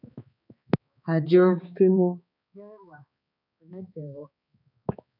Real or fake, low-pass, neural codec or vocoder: fake; 5.4 kHz; codec, 16 kHz, 2 kbps, X-Codec, HuBERT features, trained on balanced general audio